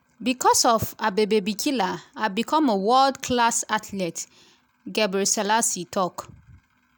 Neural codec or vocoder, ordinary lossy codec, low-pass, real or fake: none; none; none; real